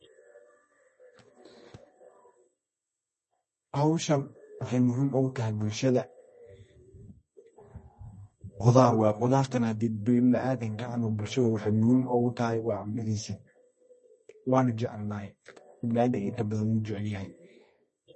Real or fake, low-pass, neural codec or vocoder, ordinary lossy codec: fake; 10.8 kHz; codec, 24 kHz, 0.9 kbps, WavTokenizer, medium music audio release; MP3, 32 kbps